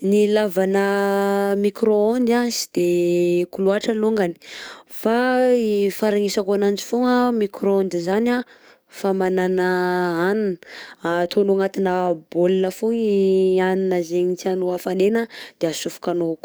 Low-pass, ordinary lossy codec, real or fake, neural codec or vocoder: none; none; fake; codec, 44.1 kHz, 7.8 kbps, DAC